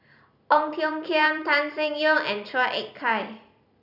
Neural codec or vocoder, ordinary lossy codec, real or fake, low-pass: vocoder, 44.1 kHz, 128 mel bands every 256 samples, BigVGAN v2; none; fake; 5.4 kHz